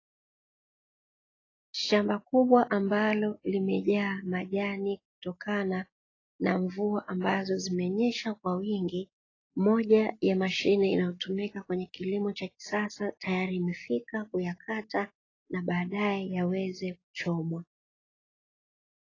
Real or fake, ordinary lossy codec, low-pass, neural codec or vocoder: real; AAC, 32 kbps; 7.2 kHz; none